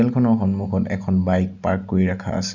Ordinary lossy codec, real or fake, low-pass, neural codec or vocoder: none; real; 7.2 kHz; none